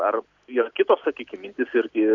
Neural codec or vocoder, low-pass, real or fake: none; 7.2 kHz; real